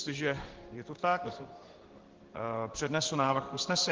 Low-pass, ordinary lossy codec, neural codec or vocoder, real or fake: 7.2 kHz; Opus, 16 kbps; codec, 16 kHz in and 24 kHz out, 1 kbps, XY-Tokenizer; fake